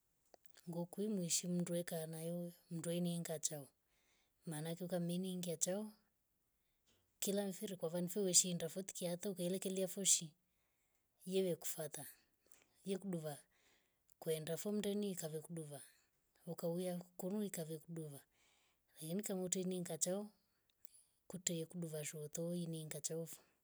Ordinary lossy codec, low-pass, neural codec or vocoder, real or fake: none; none; none; real